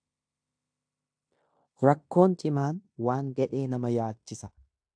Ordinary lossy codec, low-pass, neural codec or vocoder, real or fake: AAC, 64 kbps; 9.9 kHz; codec, 16 kHz in and 24 kHz out, 0.9 kbps, LongCat-Audio-Codec, fine tuned four codebook decoder; fake